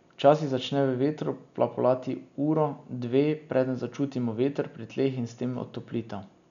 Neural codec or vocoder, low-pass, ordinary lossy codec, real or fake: none; 7.2 kHz; none; real